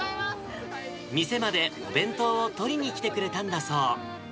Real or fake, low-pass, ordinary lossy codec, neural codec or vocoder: real; none; none; none